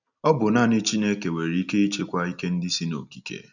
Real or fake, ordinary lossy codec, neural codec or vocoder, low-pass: real; none; none; 7.2 kHz